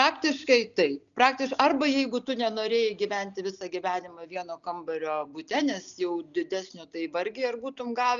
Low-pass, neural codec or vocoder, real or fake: 7.2 kHz; none; real